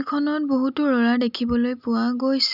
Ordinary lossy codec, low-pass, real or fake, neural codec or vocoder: none; 5.4 kHz; real; none